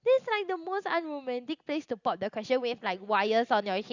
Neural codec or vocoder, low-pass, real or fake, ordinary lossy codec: none; 7.2 kHz; real; Opus, 64 kbps